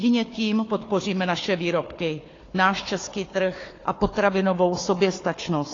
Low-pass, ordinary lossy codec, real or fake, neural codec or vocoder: 7.2 kHz; AAC, 32 kbps; fake; codec, 16 kHz, 4 kbps, FunCodec, trained on Chinese and English, 50 frames a second